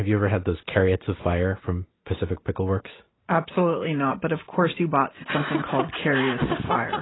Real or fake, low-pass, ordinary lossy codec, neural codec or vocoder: real; 7.2 kHz; AAC, 16 kbps; none